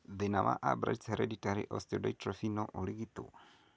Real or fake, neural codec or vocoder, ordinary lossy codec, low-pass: real; none; none; none